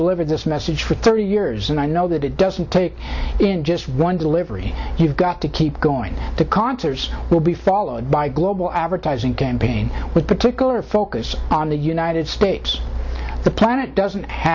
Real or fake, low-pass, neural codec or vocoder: real; 7.2 kHz; none